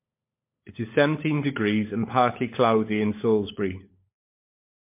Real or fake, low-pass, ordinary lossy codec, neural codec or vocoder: fake; 3.6 kHz; MP3, 24 kbps; codec, 16 kHz, 16 kbps, FunCodec, trained on LibriTTS, 50 frames a second